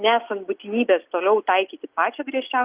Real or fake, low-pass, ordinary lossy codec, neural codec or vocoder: real; 3.6 kHz; Opus, 32 kbps; none